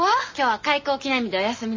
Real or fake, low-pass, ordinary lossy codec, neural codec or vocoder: real; 7.2 kHz; MP3, 32 kbps; none